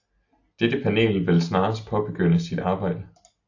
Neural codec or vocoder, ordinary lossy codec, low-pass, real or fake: none; AAC, 48 kbps; 7.2 kHz; real